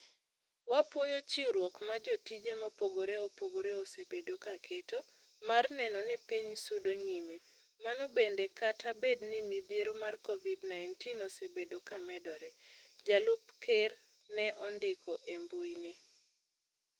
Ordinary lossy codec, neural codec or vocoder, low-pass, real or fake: Opus, 24 kbps; autoencoder, 48 kHz, 32 numbers a frame, DAC-VAE, trained on Japanese speech; 19.8 kHz; fake